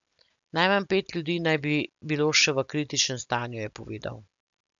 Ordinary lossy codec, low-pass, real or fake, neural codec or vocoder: none; 7.2 kHz; real; none